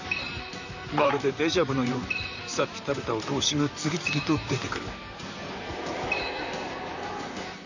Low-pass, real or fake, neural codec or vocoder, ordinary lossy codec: 7.2 kHz; fake; vocoder, 44.1 kHz, 128 mel bands, Pupu-Vocoder; none